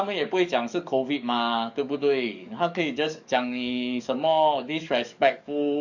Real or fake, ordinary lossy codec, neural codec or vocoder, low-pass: fake; none; codec, 44.1 kHz, 7.8 kbps, DAC; 7.2 kHz